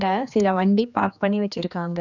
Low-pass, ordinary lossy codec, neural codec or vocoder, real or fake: 7.2 kHz; none; codec, 16 kHz, 2 kbps, X-Codec, HuBERT features, trained on general audio; fake